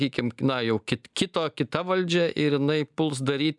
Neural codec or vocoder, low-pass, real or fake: none; 10.8 kHz; real